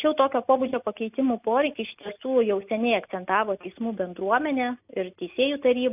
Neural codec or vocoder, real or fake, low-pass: none; real; 3.6 kHz